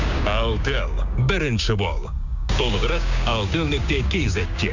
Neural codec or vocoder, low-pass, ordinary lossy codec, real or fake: codec, 16 kHz, 6 kbps, DAC; 7.2 kHz; none; fake